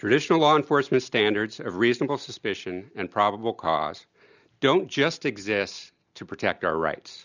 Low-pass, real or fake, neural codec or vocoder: 7.2 kHz; real; none